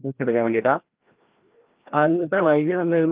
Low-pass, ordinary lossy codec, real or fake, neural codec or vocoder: 3.6 kHz; Opus, 32 kbps; fake; codec, 16 kHz, 1 kbps, FreqCodec, larger model